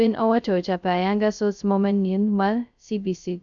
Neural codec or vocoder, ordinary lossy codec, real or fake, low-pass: codec, 16 kHz, 0.2 kbps, FocalCodec; none; fake; 7.2 kHz